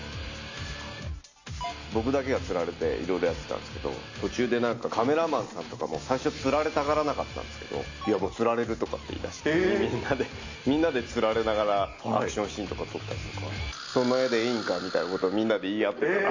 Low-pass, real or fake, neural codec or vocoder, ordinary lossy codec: 7.2 kHz; real; none; AAC, 48 kbps